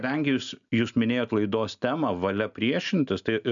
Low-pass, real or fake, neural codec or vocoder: 7.2 kHz; real; none